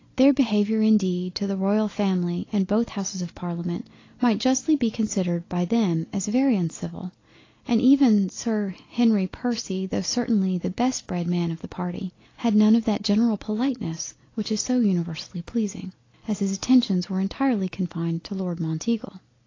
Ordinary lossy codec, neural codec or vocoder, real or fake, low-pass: AAC, 32 kbps; none; real; 7.2 kHz